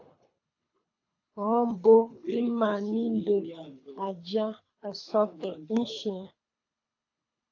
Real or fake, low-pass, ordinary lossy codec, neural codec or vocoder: fake; 7.2 kHz; AAC, 48 kbps; codec, 24 kHz, 3 kbps, HILCodec